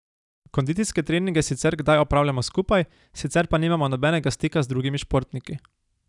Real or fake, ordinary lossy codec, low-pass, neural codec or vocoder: real; none; 10.8 kHz; none